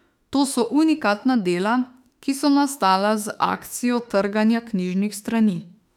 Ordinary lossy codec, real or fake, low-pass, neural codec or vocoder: none; fake; 19.8 kHz; autoencoder, 48 kHz, 32 numbers a frame, DAC-VAE, trained on Japanese speech